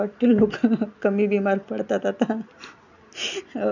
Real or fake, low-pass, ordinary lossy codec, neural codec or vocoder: real; 7.2 kHz; none; none